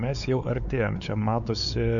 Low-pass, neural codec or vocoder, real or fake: 7.2 kHz; codec, 16 kHz, 4 kbps, X-Codec, WavLM features, trained on Multilingual LibriSpeech; fake